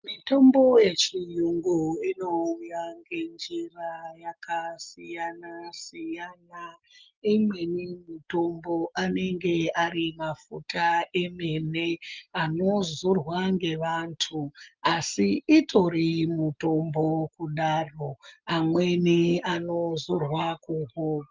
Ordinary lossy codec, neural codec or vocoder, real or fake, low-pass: Opus, 32 kbps; none; real; 7.2 kHz